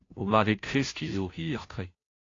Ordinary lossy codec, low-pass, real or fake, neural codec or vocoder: AAC, 32 kbps; 7.2 kHz; fake; codec, 16 kHz, 0.5 kbps, FunCodec, trained on Chinese and English, 25 frames a second